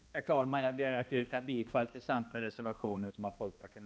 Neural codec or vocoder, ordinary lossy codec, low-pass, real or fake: codec, 16 kHz, 1 kbps, X-Codec, HuBERT features, trained on balanced general audio; none; none; fake